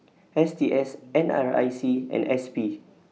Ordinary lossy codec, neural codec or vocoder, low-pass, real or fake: none; none; none; real